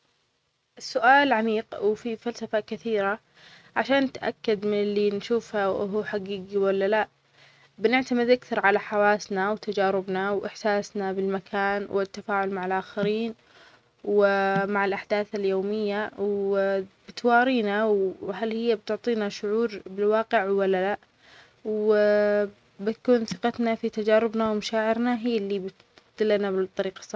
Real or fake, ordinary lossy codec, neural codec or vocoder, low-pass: real; none; none; none